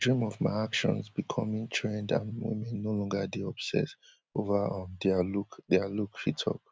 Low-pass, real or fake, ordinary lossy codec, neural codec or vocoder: none; real; none; none